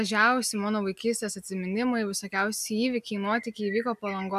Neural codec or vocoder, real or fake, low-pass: none; real; 14.4 kHz